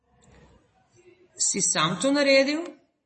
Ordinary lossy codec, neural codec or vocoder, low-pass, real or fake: MP3, 32 kbps; none; 10.8 kHz; real